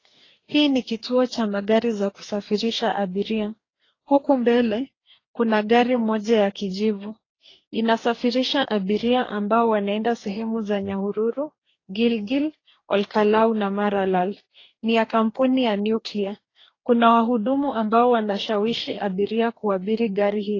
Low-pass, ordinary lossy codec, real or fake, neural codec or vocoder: 7.2 kHz; AAC, 32 kbps; fake; codec, 44.1 kHz, 2.6 kbps, DAC